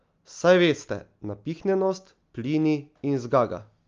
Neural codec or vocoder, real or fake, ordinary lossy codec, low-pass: none; real; Opus, 32 kbps; 7.2 kHz